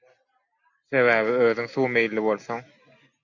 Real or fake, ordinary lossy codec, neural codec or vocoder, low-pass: real; MP3, 48 kbps; none; 7.2 kHz